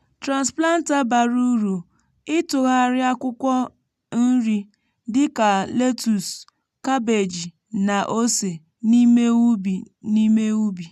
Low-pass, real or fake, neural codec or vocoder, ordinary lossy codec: 14.4 kHz; real; none; none